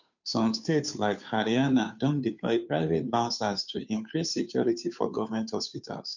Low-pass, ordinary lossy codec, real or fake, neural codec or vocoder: 7.2 kHz; none; fake; codec, 16 kHz, 2 kbps, FunCodec, trained on Chinese and English, 25 frames a second